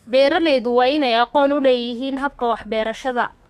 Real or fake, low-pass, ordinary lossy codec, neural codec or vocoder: fake; 14.4 kHz; none; codec, 32 kHz, 1.9 kbps, SNAC